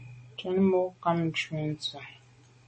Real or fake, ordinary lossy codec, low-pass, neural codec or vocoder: real; MP3, 32 kbps; 10.8 kHz; none